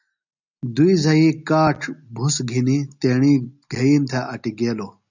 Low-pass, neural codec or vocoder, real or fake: 7.2 kHz; none; real